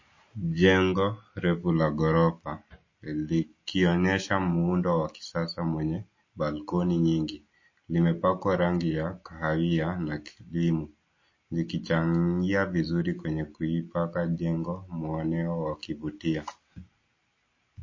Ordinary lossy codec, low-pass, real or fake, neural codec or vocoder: MP3, 32 kbps; 7.2 kHz; real; none